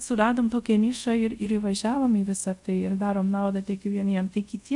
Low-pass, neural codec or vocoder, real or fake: 10.8 kHz; codec, 24 kHz, 0.5 kbps, DualCodec; fake